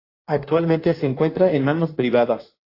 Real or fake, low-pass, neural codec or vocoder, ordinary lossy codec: fake; 5.4 kHz; codec, 16 kHz in and 24 kHz out, 1.1 kbps, FireRedTTS-2 codec; AAC, 32 kbps